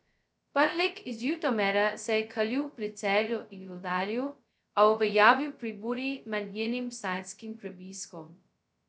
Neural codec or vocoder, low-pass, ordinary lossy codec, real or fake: codec, 16 kHz, 0.2 kbps, FocalCodec; none; none; fake